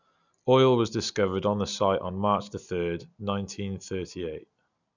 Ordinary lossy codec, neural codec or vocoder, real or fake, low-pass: none; none; real; 7.2 kHz